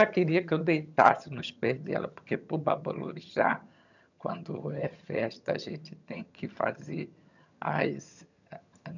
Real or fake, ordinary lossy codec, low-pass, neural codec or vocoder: fake; none; 7.2 kHz; vocoder, 22.05 kHz, 80 mel bands, HiFi-GAN